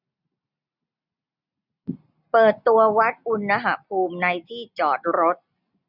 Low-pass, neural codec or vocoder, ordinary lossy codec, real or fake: 5.4 kHz; none; none; real